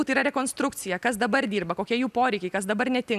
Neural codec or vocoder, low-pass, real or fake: none; 14.4 kHz; real